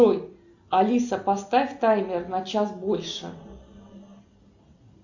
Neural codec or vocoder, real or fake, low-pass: none; real; 7.2 kHz